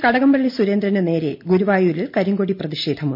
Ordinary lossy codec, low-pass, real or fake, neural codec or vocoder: MP3, 32 kbps; 5.4 kHz; real; none